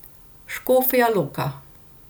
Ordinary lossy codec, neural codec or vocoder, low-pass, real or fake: none; none; none; real